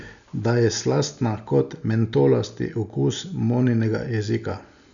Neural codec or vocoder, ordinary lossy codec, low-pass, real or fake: none; none; 7.2 kHz; real